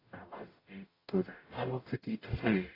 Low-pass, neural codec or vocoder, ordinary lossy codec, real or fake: 5.4 kHz; codec, 44.1 kHz, 0.9 kbps, DAC; AAC, 24 kbps; fake